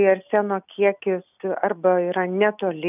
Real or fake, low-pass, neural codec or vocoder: real; 3.6 kHz; none